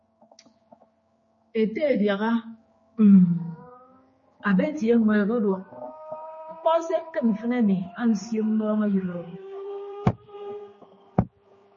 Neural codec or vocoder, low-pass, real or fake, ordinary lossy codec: codec, 16 kHz, 4 kbps, X-Codec, HuBERT features, trained on general audio; 7.2 kHz; fake; MP3, 32 kbps